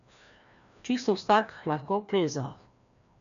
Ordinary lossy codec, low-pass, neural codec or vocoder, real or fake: none; 7.2 kHz; codec, 16 kHz, 1 kbps, FreqCodec, larger model; fake